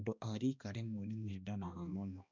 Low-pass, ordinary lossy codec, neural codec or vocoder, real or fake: 7.2 kHz; none; codec, 16 kHz, 1 kbps, X-Codec, HuBERT features, trained on balanced general audio; fake